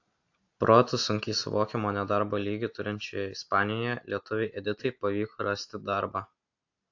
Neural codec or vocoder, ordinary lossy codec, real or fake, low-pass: none; AAC, 48 kbps; real; 7.2 kHz